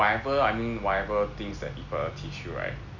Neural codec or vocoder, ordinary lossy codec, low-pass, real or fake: none; none; 7.2 kHz; real